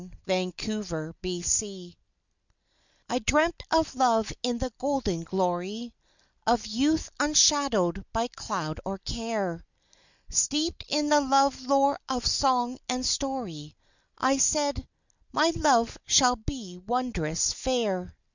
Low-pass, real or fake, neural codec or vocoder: 7.2 kHz; real; none